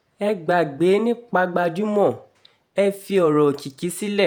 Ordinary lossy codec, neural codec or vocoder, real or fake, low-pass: none; vocoder, 48 kHz, 128 mel bands, Vocos; fake; none